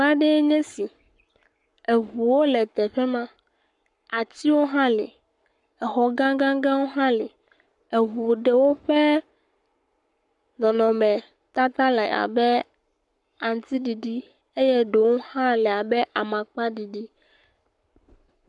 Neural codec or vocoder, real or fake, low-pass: codec, 44.1 kHz, 7.8 kbps, Pupu-Codec; fake; 10.8 kHz